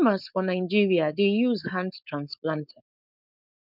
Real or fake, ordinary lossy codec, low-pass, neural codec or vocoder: fake; none; 5.4 kHz; codec, 16 kHz, 4.8 kbps, FACodec